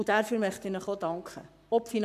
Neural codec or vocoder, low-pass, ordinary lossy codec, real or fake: codec, 44.1 kHz, 7.8 kbps, Pupu-Codec; 14.4 kHz; none; fake